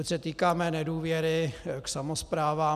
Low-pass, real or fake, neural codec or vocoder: 14.4 kHz; real; none